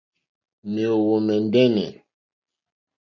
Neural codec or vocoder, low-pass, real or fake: none; 7.2 kHz; real